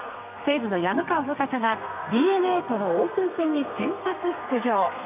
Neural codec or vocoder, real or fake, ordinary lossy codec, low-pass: codec, 32 kHz, 1.9 kbps, SNAC; fake; none; 3.6 kHz